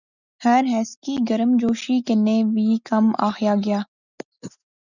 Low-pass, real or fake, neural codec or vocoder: 7.2 kHz; real; none